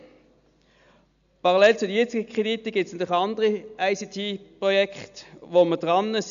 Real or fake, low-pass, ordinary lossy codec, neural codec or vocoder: real; 7.2 kHz; none; none